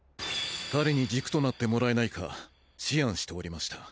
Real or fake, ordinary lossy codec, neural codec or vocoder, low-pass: real; none; none; none